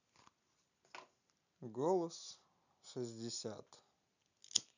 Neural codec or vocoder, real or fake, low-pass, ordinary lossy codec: none; real; 7.2 kHz; none